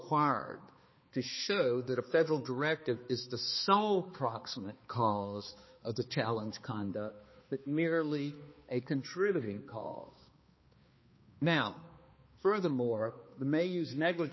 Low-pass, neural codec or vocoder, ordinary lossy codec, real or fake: 7.2 kHz; codec, 16 kHz, 2 kbps, X-Codec, HuBERT features, trained on balanced general audio; MP3, 24 kbps; fake